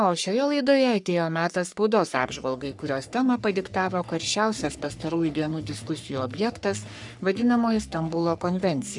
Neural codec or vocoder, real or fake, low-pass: codec, 44.1 kHz, 3.4 kbps, Pupu-Codec; fake; 10.8 kHz